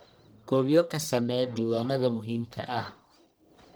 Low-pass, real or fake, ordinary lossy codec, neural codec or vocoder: none; fake; none; codec, 44.1 kHz, 1.7 kbps, Pupu-Codec